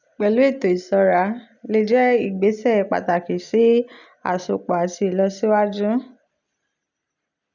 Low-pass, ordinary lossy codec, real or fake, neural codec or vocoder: 7.2 kHz; none; real; none